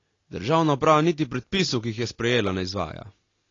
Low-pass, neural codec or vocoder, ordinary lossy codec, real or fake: 7.2 kHz; none; AAC, 32 kbps; real